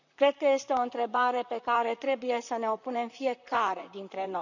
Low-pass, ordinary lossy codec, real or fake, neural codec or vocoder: 7.2 kHz; none; fake; vocoder, 44.1 kHz, 128 mel bands, Pupu-Vocoder